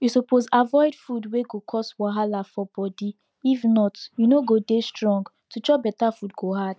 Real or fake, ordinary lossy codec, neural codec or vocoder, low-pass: real; none; none; none